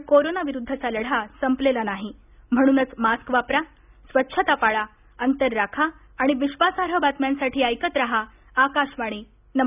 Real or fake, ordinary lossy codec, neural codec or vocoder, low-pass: real; none; none; 3.6 kHz